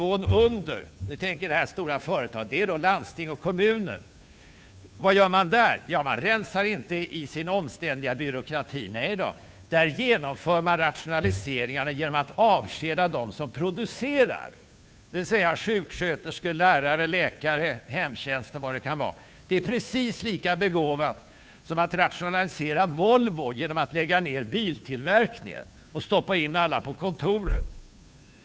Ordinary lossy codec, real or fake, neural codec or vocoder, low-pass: none; fake; codec, 16 kHz, 2 kbps, FunCodec, trained on Chinese and English, 25 frames a second; none